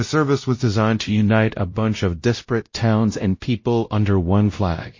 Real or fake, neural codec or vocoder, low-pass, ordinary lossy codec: fake; codec, 16 kHz, 0.5 kbps, X-Codec, WavLM features, trained on Multilingual LibriSpeech; 7.2 kHz; MP3, 32 kbps